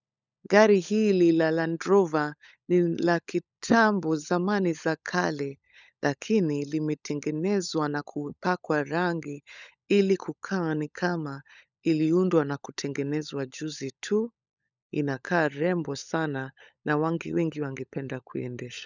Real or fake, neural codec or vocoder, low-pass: fake; codec, 16 kHz, 16 kbps, FunCodec, trained on LibriTTS, 50 frames a second; 7.2 kHz